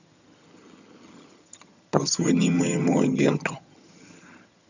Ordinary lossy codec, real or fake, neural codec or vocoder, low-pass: none; fake; vocoder, 22.05 kHz, 80 mel bands, HiFi-GAN; 7.2 kHz